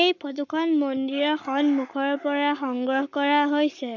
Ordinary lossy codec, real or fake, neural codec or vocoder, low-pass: none; fake; codec, 44.1 kHz, 7.8 kbps, Pupu-Codec; 7.2 kHz